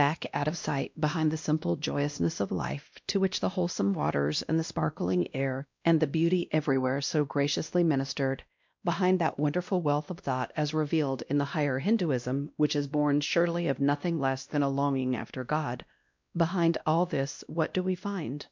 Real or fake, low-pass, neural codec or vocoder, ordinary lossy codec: fake; 7.2 kHz; codec, 16 kHz, 1 kbps, X-Codec, WavLM features, trained on Multilingual LibriSpeech; MP3, 64 kbps